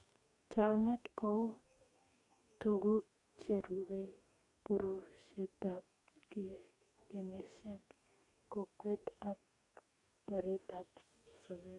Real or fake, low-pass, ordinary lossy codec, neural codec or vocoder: fake; 10.8 kHz; AAC, 48 kbps; codec, 44.1 kHz, 2.6 kbps, DAC